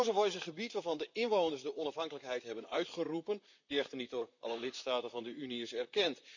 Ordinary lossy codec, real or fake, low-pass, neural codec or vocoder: none; fake; 7.2 kHz; vocoder, 44.1 kHz, 128 mel bands, Pupu-Vocoder